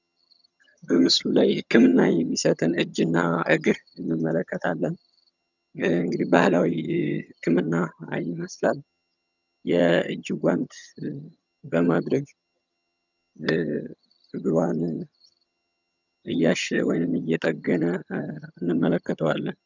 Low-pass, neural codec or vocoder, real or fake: 7.2 kHz; vocoder, 22.05 kHz, 80 mel bands, HiFi-GAN; fake